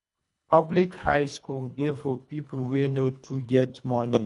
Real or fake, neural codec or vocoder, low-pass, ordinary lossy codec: fake; codec, 24 kHz, 1.5 kbps, HILCodec; 10.8 kHz; none